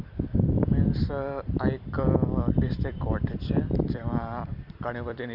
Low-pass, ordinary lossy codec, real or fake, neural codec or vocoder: 5.4 kHz; Opus, 64 kbps; fake; vocoder, 44.1 kHz, 128 mel bands every 256 samples, BigVGAN v2